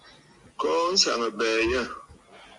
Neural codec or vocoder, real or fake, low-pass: none; real; 10.8 kHz